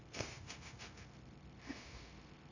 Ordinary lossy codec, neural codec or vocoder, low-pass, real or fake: AAC, 48 kbps; codec, 16 kHz, 0.9 kbps, LongCat-Audio-Codec; 7.2 kHz; fake